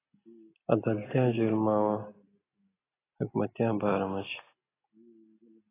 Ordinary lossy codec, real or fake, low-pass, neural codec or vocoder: AAC, 16 kbps; real; 3.6 kHz; none